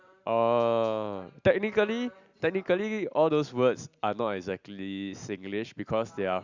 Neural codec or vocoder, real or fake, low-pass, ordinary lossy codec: none; real; 7.2 kHz; Opus, 64 kbps